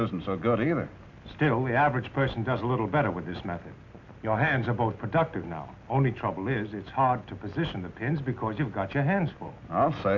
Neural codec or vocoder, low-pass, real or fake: none; 7.2 kHz; real